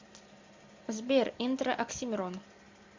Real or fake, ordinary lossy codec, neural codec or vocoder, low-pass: real; MP3, 64 kbps; none; 7.2 kHz